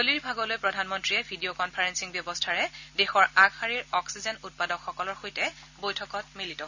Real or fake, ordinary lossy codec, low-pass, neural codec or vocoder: real; none; 7.2 kHz; none